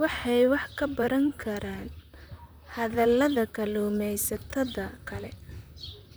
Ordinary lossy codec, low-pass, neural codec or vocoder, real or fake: none; none; vocoder, 44.1 kHz, 128 mel bands, Pupu-Vocoder; fake